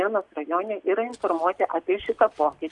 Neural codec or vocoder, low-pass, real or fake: none; 10.8 kHz; real